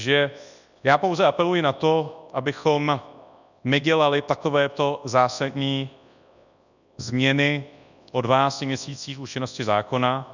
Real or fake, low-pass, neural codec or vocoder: fake; 7.2 kHz; codec, 24 kHz, 0.9 kbps, WavTokenizer, large speech release